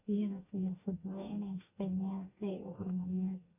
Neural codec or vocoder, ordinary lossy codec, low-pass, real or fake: codec, 44.1 kHz, 2.6 kbps, DAC; none; 3.6 kHz; fake